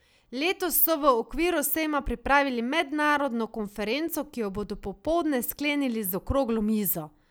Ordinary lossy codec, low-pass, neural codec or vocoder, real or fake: none; none; none; real